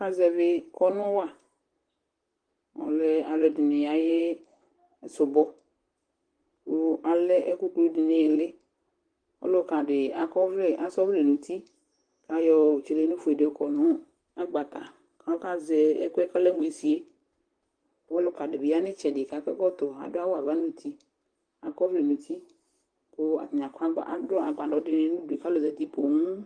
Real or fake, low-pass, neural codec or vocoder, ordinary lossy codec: fake; 9.9 kHz; vocoder, 44.1 kHz, 128 mel bands, Pupu-Vocoder; Opus, 24 kbps